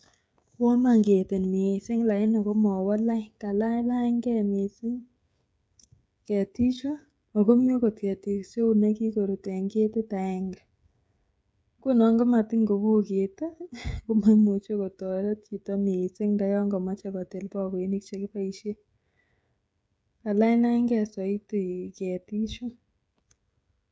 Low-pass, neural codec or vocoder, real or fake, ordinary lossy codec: none; codec, 16 kHz, 16 kbps, FreqCodec, smaller model; fake; none